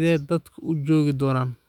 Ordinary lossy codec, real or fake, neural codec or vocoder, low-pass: none; fake; autoencoder, 48 kHz, 128 numbers a frame, DAC-VAE, trained on Japanese speech; 19.8 kHz